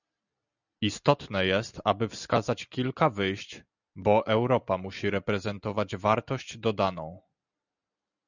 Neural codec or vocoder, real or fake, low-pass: none; real; 7.2 kHz